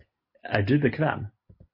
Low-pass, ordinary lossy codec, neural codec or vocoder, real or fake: 5.4 kHz; MP3, 32 kbps; none; real